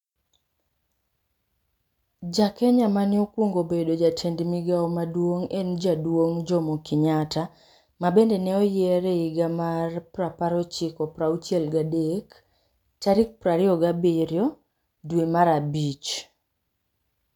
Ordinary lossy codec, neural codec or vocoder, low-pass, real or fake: none; none; 19.8 kHz; real